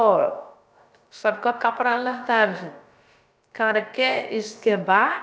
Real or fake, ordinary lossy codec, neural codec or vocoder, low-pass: fake; none; codec, 16 kHz, about 1 kbps, DyCAST, with the encoder's durations; none